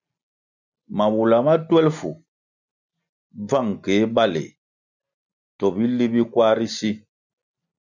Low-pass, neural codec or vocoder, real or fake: 7.2 kHz; none; real